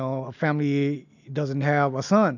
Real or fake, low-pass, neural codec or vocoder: real; 7.2 kHz; none